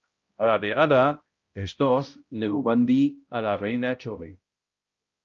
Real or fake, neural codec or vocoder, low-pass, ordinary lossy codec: fake; codec, 16 kHz, 0.5 kbps, X-Codec, HuBERT features, trained on balanced general audio; 7.2 kHz; Opus, 24 kbps